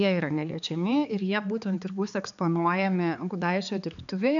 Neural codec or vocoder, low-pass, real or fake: codec, 16 kHz, 4 kbps, X-Codec, HuBERT features, trained on balanced general audio; 7.2 kHz; fake